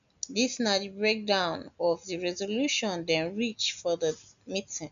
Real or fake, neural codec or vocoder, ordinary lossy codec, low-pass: real; none; none; 7.2 kHz